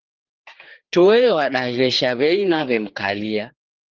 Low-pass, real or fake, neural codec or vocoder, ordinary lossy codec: 7.2 kHz; fake; codec, 16 kHz, 4 kbps, X-Codec, HuBERT features, trained on general audio; Opus, 16 kbps